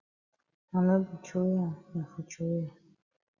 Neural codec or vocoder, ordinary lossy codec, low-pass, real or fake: none; Opus, 64 kbps; 7.2 kHz; real